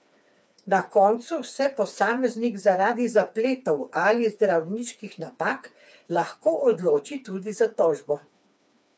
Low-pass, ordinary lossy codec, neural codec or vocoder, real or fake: none; none; codec, 16 kHz, 4 kbps, FreqCodec, smaller model; fake